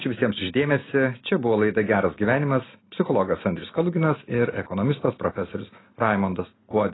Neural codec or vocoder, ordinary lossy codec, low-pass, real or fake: none; AAC, 16 kbps; 7.2 kHz; real